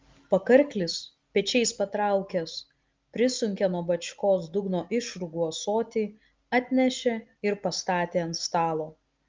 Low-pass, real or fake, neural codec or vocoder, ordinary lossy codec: 7.2 kHz; real; none; Opus, 24 kbps